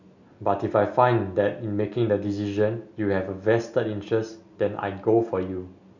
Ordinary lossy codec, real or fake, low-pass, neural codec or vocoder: none; real; 7.2 kHz; none